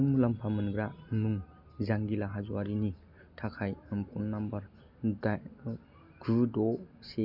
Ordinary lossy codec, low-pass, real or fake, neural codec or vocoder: none; 5.4 kHz; real; none